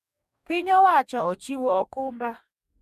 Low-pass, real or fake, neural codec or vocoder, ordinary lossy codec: 14.4 kHz; fake; codec, 44.1 kHz, 2.6 kbps, DAC; none